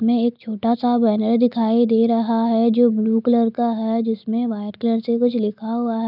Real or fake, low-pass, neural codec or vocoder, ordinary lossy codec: real; 5.4 kHz; none; none